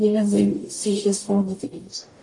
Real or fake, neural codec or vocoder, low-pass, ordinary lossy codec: fake; codec, 44.1 kHz, 0.9 kbps, DAC; 10.8 kHz; AAC, 48 kbps